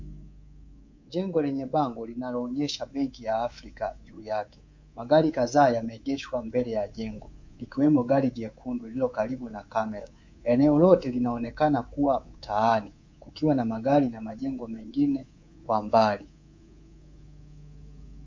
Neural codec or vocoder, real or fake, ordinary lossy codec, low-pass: codec, 24 kHz, 3.1 kbps, DualCodec; fake; MP3, 48 kbps; 7.2 kHz